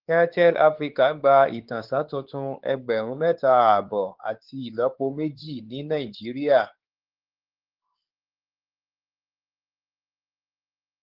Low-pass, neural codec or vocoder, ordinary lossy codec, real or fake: 5.4 kHz; codec, 16 kHz, 4 kbps, X-Codec, WavLM features, trained on Multilingual LibriSpeech; Opus, 16 kbps; fake